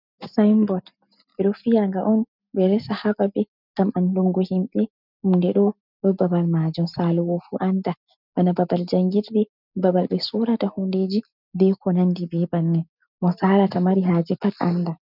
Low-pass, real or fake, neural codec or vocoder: 5.4 kHz; real; none